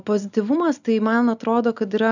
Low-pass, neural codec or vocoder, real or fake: 7.2 kHz; none; real